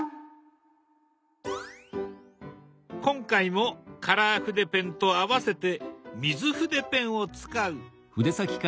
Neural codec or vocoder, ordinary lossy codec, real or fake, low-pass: none; none; real; none